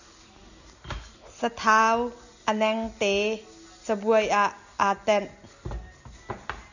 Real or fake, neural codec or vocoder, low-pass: real; none; 7.2 kHz